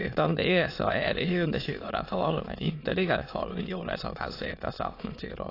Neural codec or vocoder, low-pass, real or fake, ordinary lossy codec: autoencoder, 22.05 kHz, a latent of 192 numbers a frame, VITS, trained on many speakers; 5.4 kHz; fake; AAC, 32 kbps